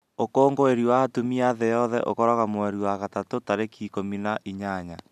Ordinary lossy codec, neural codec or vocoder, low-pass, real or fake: none; none; 14.4 kHz; real